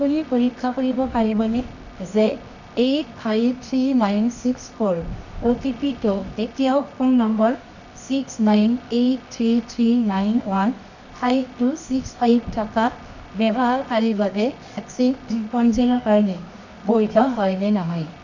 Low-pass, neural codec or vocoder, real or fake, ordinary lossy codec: 7.2 kHz; codec, 24 kHz, 0.9 kbps, WavTokenizer, medium music audio release; fake; none